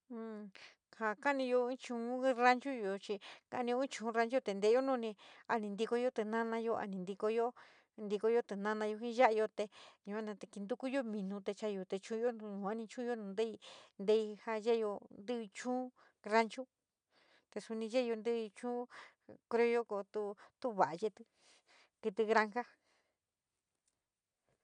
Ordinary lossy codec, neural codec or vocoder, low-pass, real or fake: none; none; 9.9 kHz; real